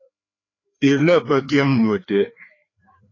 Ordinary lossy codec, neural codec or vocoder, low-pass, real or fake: AAC, 32 kbps; codec, 16 kHz, 2 kbps, FreqCodec, larger model; 7.2 kHz; fake